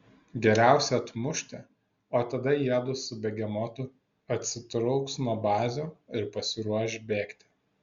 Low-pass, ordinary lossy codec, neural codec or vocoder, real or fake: 7.2 kHz; Opus, 64 kbps; none; real